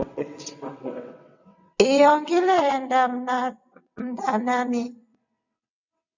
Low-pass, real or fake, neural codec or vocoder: 7.2 kHz; fake; vocoder, 22.05 kHz, 80 mel bands, WaveNeXt